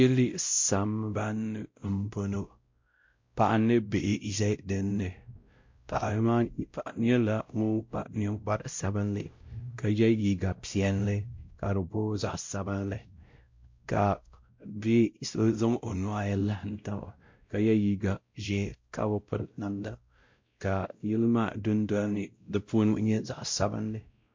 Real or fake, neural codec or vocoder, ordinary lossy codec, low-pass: fake; codec, 16 kHz, 0.5 kbps, X-Codec, WavLM features, trained on Multilingual LibriSpeech; MP3, 48 kbps; 7.2 kHz